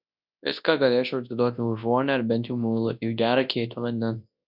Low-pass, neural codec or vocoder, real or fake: 5.4 kHz; codec, 24 kHz, 0.9 kbps, WavTokenizer, large speech release; fake